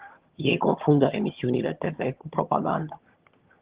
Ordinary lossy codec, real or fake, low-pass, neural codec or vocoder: Opus, 16 kbps; fake; 3.6 kHz; vocoder, 22.05 kHz, 80 mel bands, HiFi-GAN